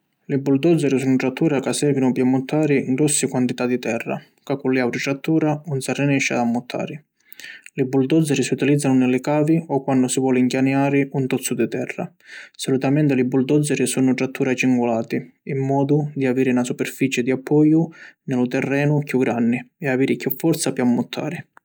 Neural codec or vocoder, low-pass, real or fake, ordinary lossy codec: none; none; real; none